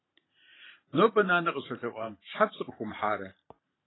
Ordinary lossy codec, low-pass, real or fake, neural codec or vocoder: AAC, 16 kbps; 7.2 kHz; fake; vocoder, 44.1 kHz, 128 mel bands every 512 samples, BigVGAN v2